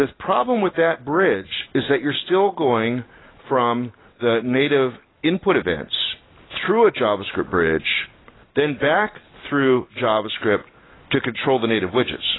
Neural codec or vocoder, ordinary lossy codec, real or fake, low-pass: none; AAC, 16 kbps; real; 7.2 kHz